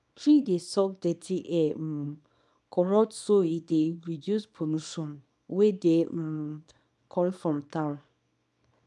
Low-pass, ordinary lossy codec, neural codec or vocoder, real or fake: none; none; codec, 24 kHz, 0.9 kbps, WavTokenizer, small release; fake